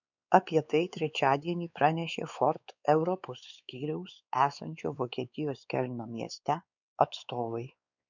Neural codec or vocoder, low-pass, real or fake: codec, 16 kHz, 4 kbps, X-Codec, WavLM features, trained on Multilingual LibriSpeech; 7.2 kHz; fake